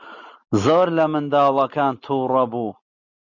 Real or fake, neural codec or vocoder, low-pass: real; none; 7.2 kHz